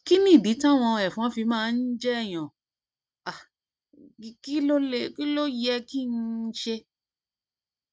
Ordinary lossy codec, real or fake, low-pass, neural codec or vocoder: none; real; none; none